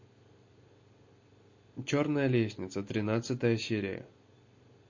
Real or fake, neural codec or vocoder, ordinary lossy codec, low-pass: real; none; MP3, 32 kbps; 7.2 kHz